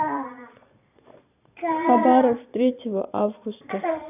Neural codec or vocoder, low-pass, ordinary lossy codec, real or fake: vocoder, 22.05 kHz, 80 mel bands, Vocos; 3.6 kHz; none; fake